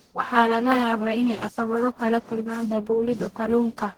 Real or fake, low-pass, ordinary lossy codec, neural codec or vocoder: fake; 19.8 kHz; Opus, 16 kbps; codec, 44.1 kHz, 0.9 kbps, DAC